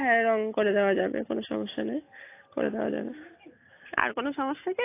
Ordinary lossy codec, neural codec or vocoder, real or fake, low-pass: AAC, 24 kbps; none; real; 3.6 kHz